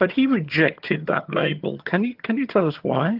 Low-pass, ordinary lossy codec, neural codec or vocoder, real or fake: 5.4 kHz; Opus, 24 kbps; vocoder, 22.05 kHz, 80 mel bands, HiFi-GAN; fake